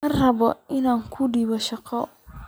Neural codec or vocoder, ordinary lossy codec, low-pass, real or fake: none; none; none; real